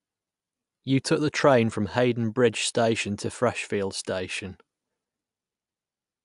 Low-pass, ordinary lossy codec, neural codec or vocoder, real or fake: 10.8 kHz; AAC, 96 kbps; none; real